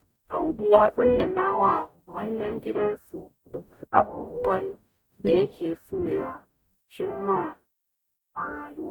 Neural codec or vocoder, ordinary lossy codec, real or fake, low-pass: codec, 44.1 kHz, 0.9 kbps, DAC; none; fake; 19.8 kHz